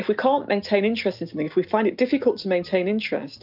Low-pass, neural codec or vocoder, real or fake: 5.4 kHz; none; real